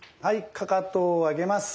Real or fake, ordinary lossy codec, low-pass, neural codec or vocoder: real; none; none; none